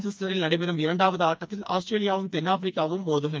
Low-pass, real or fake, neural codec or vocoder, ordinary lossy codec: none; fake; codec, 16 kHz, 2 kbps, FreqCodec, smaller model; none